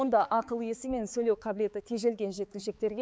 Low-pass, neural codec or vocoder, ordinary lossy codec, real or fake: none; codec, 16 kHz, 4 kbps, X-Codec, HuBERT features, trained on balanced general audio; none; fake